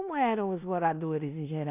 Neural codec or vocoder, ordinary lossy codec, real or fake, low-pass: codec, 16 kHz in and 24 kHz out, 0.9 kbps, LongCat-Audio-Codec, four codebook decoder; none; fake; 3.6 kHz